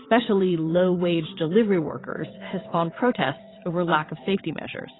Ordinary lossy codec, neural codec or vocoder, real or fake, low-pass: AAC, 16 kbps; vocoder, 22.05 kHz, 80 mel bands, WaveNeXt; fake; 7.2 kHz